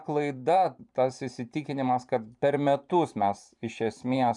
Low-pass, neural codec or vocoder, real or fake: 10.8 kHz; vocoder, 44.1 kHz, 128 mel bands every 512 samples, BigVGAN v2; fake